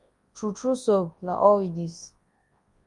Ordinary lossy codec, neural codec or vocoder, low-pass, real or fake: Opus, 32 kbps; codec, 24 kHz, 0.9 kbps, WavTokenizer, large speech release; 10.8 kHz; fake